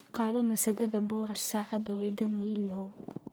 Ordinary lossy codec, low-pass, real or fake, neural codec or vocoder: none; none; fake; codec, 44.1 kHz, 1.7 kbps, Pupu-Codec